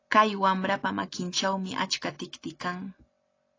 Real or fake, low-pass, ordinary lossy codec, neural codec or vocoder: real; 7.2 kHz; AAC, 32 kbps; none